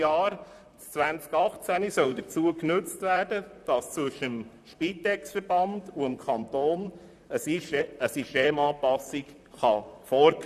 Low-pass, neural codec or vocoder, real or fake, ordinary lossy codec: 14.4 kHz; vocoder, 44.1 kHz, 128 mel bands, Pupu-Vocoder; fake; none